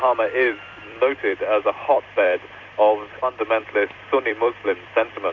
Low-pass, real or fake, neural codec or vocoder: 7.2 kHz; fake; vocoder, 44.1 kHz, 128 mel bands every 256 samples, BigVGAN v2